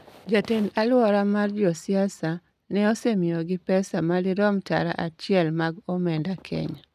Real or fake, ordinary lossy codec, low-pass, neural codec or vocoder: real; none; 14.4 kHz; none